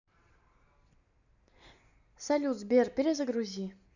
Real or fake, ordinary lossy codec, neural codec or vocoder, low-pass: real; none; none; 7.2 kHz